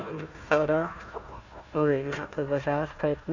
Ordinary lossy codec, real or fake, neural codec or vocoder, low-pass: none; fake; codec, 16 kHz, 1 kbps, FunCodec, trained on Chinese and English, 50 frames a second; 7.2 kHz